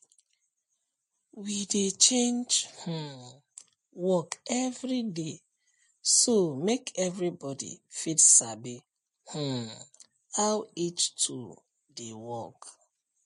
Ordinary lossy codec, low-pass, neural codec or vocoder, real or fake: MP3, 48 kbps; 10.8 kHz; none; real